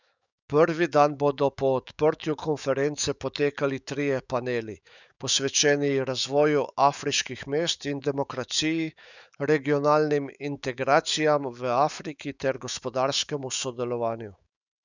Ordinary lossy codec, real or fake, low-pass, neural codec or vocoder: none; fake; 7.2 kHz; autoencoder, 48 kHz, 128 numbers a frame, DAC-VAE, trained on Japanese speech